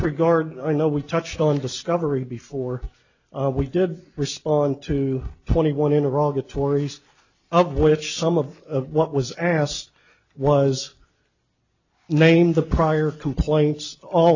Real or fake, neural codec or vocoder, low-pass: real; none; 7.2 kHz